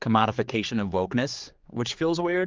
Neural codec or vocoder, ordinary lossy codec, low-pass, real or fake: codec, 16 kHz, 4 kbps, X-Codec, HuBERT features, trained on general audio; Opus, 24 kbps; 7.2 kHz; fake